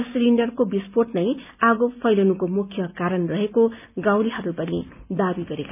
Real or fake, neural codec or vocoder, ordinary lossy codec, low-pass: real; none; none; 3.6 kHz